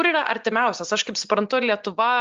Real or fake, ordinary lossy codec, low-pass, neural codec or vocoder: real; Opus, 32 kbps; 7.2 kHz; none